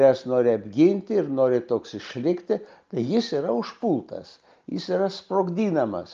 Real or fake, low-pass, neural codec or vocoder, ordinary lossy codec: real; 7.2 kHz; none; Opus, 24 kbps